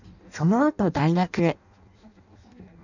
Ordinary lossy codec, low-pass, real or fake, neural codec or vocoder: none; 7.2 kHz; fake; codec, 16 kHz in and 24 kHz out, 0.6 kbps, FireRedTTS-2 codec